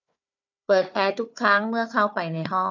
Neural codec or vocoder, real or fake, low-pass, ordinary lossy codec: codec, 16 kHz, 4 kbps, FunCodec, trained on Chinese and English, 50 frames a second; fake; 7.2 kHz; none